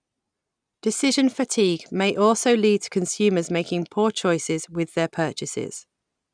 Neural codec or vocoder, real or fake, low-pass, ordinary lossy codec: none; real; 9.9 kHz; none